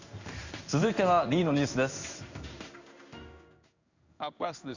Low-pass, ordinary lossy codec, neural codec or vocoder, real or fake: 7.2 kHz; none; codec, 16 kHz in and 24 kHz out, 1 kbps, XY-Tokenizer; fake